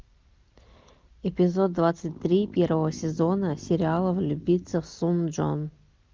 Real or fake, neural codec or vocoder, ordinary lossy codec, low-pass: real; none; Opus, 32 kbps; 7.2 kHz